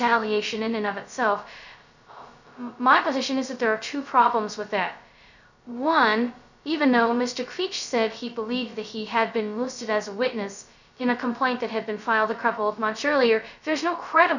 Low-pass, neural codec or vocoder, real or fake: 7.2 kHz; codec, 16 kHz, 0.2 kbps, FocalCodec; fake